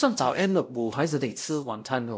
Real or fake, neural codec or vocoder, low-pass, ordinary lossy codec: fake; codec, 16 kHz, 0.5 kbps, X-Codec, WavLM features, trained on Multilingual LibriSpeech; none; none